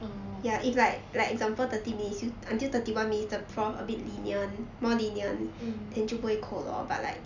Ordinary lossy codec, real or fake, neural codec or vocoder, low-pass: none; real; none; 7.2 kHz